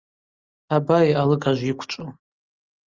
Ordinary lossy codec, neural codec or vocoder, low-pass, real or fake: Opus, 32 kbps; none; 7.2 kHz; real